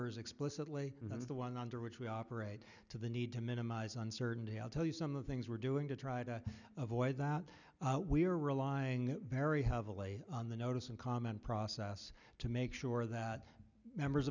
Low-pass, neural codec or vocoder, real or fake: 7.2 kHz; none; real